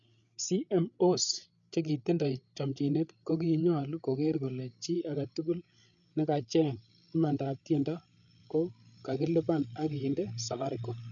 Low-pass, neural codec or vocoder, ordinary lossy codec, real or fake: 7.2 kHz; codec, 16 kHz, 8 kbps, FreqCodec, larger model; none; fake